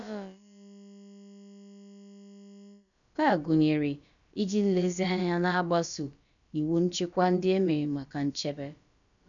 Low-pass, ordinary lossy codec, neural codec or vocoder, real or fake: 7.2 kHz; none; codec, 16 kHz, about 1 kbps, DyCAST, with the encoder's durations; fake